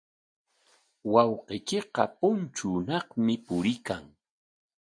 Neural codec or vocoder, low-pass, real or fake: none; 9.9 kHz; real